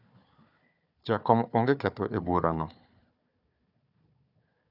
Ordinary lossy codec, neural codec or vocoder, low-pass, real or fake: none; codec, 16 kHz, 4 kbps, FunCodec, trained on Chinese and English, 50 frames a second; 5.4 kHz; fake